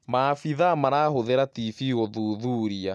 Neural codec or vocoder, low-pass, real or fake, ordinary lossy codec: none; none; real; none